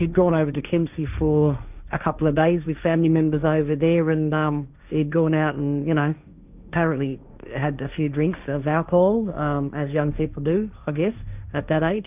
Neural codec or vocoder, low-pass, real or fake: codec, 16 kHz, 1.1 kbps, Voila-Tokenizer; 3.6 kHz; fake